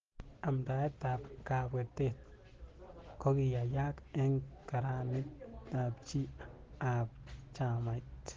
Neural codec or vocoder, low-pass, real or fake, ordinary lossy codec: none; 7.2 kHz; real; Opus, 16 kbps